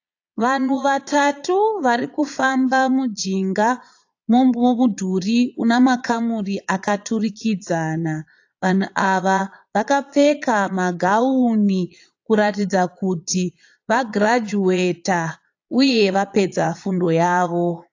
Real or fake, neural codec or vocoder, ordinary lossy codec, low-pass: fake; vocoder, 22.05 kHz, 80 mel bands, Vocos; AAC, 48 kbps; 7.2 kHz